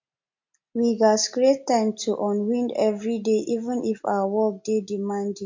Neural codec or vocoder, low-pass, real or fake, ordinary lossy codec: none; 7.2 kHz; real; MP3, 48 kbps